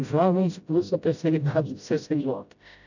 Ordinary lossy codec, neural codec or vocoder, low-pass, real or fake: none; codec, 16 kHz, 0.5 kbps, FreqCodec, smaller model; 7.2 kHz; fake